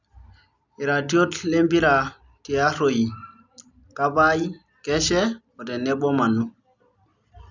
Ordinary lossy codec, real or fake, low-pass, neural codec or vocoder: none; real; 7.2 kHz; none